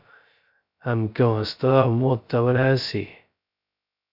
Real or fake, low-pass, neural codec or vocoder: fake; 5.4 kHz; codec, 16 kHz, 0.2 kbps, FocalCodec